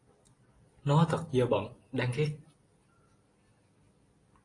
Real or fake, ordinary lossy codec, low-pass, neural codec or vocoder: real; AAC, 32 kbps; 10.8 kHz; none